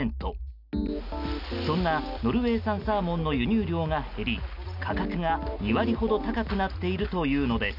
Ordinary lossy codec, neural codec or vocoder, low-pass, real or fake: none; none; 5.4 kHz; real